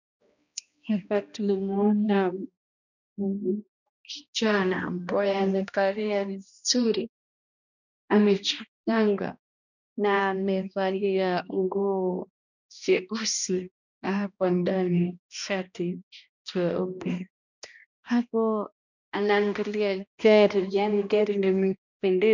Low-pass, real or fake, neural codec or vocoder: 7.2 kHz; fake; codec, 16 kHz, 1 kbps, X-Codec, HuBERT features, trained on balanced general audio